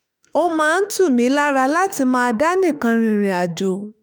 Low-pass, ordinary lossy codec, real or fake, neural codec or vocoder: none; none; fake; autoencoder, 48 kHz, 32 numbers a frame, DAC-VAE, trained on Japanese speech